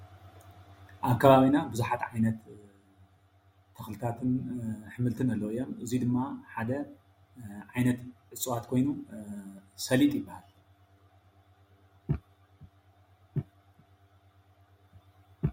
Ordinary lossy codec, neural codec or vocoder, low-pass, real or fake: MP3, 64 kbps; none; 19.8 kHz; real